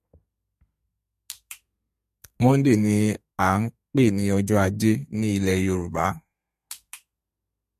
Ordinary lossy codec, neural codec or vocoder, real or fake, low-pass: MP3, 64 kbps; codec, 32 kHz, 1.9 kbps, SNAC; fake; 14.4 kHz